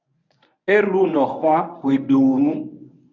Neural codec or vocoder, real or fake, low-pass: codec, 24 kHz, 0.9 kbps, WavTokenizer, medium speech release version 1; fake; 7.2 kHz